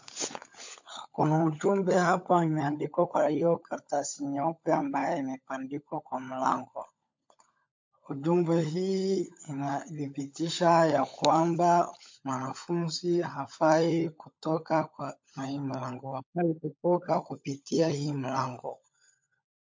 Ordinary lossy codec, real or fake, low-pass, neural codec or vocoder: MP3, 48 kbps; fake; 7.2 kHz; codec, 16 kHz, 16 kbps, FunCodec, trained on LibriTTS, 50 frames a second